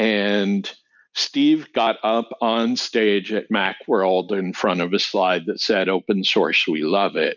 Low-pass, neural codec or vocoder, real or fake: 7.2 kHz; none; real